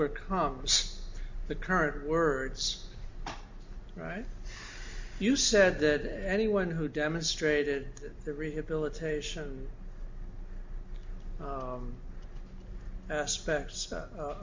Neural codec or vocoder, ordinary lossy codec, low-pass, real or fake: none; MP3, 48 kbps; 7.2 kHz; real